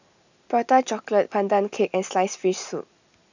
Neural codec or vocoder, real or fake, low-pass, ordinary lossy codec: none; real; 7.2 kHz; none